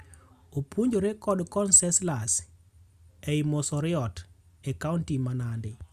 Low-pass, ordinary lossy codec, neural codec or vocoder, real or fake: 14.4 kHz; Opus, 64 kbps; none; real